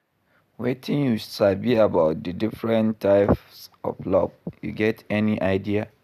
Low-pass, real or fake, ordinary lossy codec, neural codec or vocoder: 14.4 kHz; real; none; none